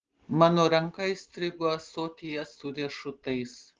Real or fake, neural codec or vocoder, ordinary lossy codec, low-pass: real; none; Opus, 16 kbps; 7.2 kHz